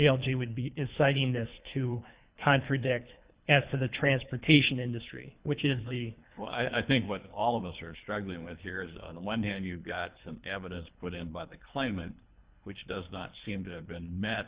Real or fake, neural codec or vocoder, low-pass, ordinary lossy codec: fake; codec, 24 kHz, 3 kbps, HILCodec; 3.6 kHz; Opus, 64 kbps